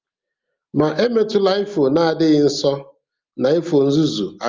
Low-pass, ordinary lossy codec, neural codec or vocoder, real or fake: 7.2 kHz; Opus, 32 kbps; none; real